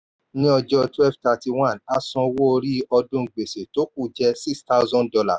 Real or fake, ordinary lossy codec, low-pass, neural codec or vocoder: real; none; none; none